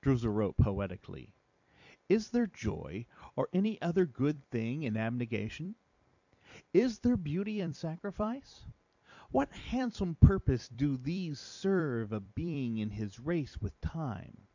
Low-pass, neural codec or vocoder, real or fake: 7.2 kHz; vocoder, 44.1 kHz, 128 mel bands every 256 samples, BigVGAN v2; fake